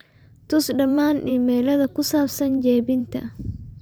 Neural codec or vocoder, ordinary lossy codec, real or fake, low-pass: vocoder, 44.1 kHz, 128 mel bands every 512 samples, BigVGAN v2; none; fake; none